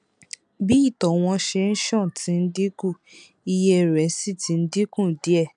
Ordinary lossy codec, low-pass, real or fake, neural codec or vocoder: none; 9.9 kHz; real; none